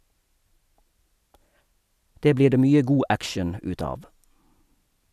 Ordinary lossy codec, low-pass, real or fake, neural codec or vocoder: none; 14.4 kHz; real; none